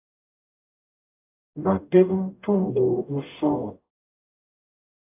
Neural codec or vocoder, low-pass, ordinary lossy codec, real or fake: codec, 44.1 kHz, 0.9 kbps, DAC; 3.6 kHz; AAC, 24 kbps; fake